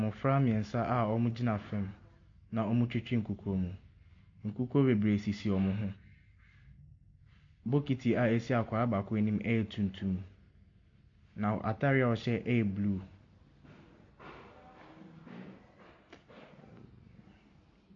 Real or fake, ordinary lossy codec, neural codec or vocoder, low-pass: real; MP3, 48 kbps; none; 7.2 kHz